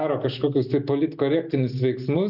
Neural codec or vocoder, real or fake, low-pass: none; real; 5.4 kHz